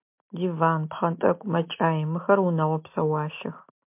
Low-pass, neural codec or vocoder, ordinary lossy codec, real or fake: 3.6 kHz; none; MP3, 32 kbps; real